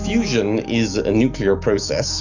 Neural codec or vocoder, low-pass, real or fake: autoencoder, 48 kHz, 128 numbers a frame, DAC-VAE, trained on Japanese speech; 7.2 kHz; fake